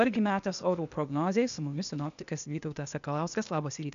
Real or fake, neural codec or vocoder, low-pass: fake; codec, 16 kHz, 0.8 kbps, ZipCodec; 7.2 kHz